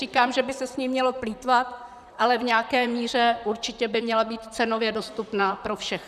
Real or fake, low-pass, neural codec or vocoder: fake; 14.4 kHz; vocoder, 44.1 kHz, 128 mel bands, Pupu-Vocoder